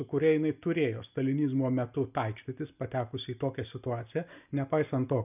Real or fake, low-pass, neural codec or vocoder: real; 3.6 kHz; none